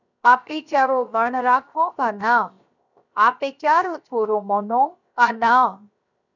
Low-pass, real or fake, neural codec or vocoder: 7.2 kHz; fake; codec, 16 kHz, 0.7 kbps, FocalCodec